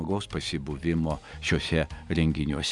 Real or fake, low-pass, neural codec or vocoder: real; 10.8 kHz; none